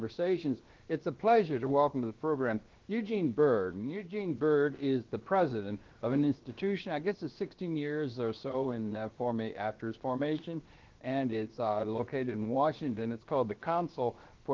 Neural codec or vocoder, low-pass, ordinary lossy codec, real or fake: codec, 16 kHz, about 1 kbps, DyCAST, with the encoder's durations; 7.2 kHz; Opus, 16 kbps; fake